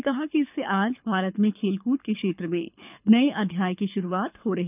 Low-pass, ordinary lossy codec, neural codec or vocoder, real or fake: 3.6 kHz; none; codec, 24 kHz, 6 kbps, HILCodec; fake